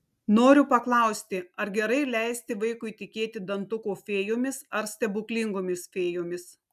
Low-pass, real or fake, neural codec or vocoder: 14.4 kHz; real; none